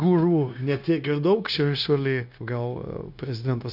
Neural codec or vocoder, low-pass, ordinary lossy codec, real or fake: codec, 16 kHz, 0.9 kbps, LongCat-Audio-Codec; 5.4 kHz; AAC, 48 kbps; fake